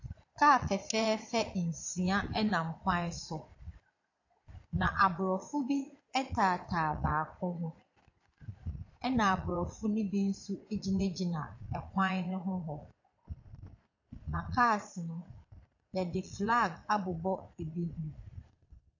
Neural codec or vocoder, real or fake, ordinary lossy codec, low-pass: vocoder, 22.05 kHz, 80 mel bands, Vocos; fake; AAC, 48 kbps; 7.2 kHz